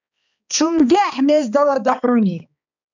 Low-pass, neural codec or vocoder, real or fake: 7.2 kHz; codec, 16 kHz, 2 kbps, X-Codec, HuBERT features, trained on balanced general audio; fake